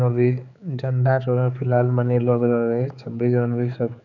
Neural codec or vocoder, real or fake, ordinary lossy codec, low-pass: codec, 16 kHz, 4 kbps, X-Codec, HuBERT features, trained on general audio; fake; none; 7.2 kHz